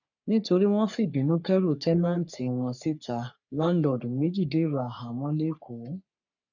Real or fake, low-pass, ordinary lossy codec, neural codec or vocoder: fake; 7.2 kHz; none; codec, 44.1 kHz, 3.4 kbps, Pupu-Codec